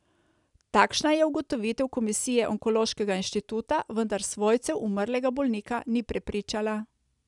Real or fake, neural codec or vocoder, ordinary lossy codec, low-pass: real; none; none; 10.8 kHz